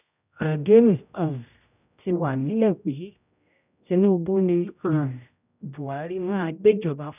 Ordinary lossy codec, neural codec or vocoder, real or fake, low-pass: none; codec, 16 kHz, 0.5 kbps, X-Codec, HuBERT features, trained on general audio; fake; 3.6 kHz